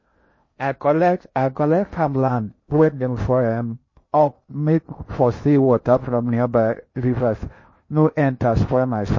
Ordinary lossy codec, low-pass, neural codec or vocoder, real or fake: MP3, 32 kbps; 7.2 kHz; codec, 16 kHz in and 24 kHz out, 0.6 kbps, FocalCodec, streaming, 2048 codes; fake